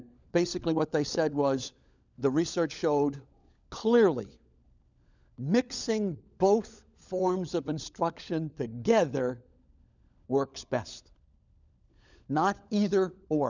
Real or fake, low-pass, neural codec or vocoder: fake; 7.2 kHz; codec, 16 kHz, 16 kbps, FunCodec, trained on LibriTTS, 50 frames a second